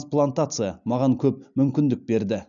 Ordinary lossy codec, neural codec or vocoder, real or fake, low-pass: none; none; real; 7.2 kHz